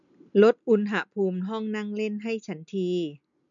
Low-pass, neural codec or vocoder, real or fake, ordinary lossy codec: 7.2 kHz; none; real; MP3, 96 kbps